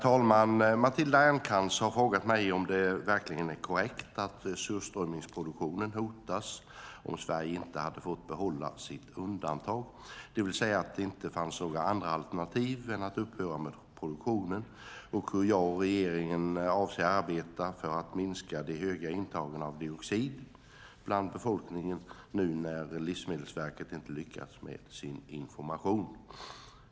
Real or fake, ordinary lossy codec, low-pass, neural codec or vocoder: real; none; none; none